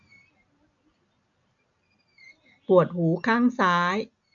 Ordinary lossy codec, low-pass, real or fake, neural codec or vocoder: AAC, 64 kbps; 7.2 kHz; real; none